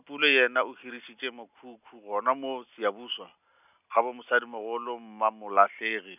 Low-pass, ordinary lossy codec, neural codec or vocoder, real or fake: 3.6 kHz; none; none; real